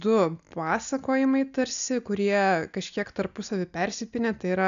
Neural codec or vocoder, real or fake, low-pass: none; real; 7.2 kHz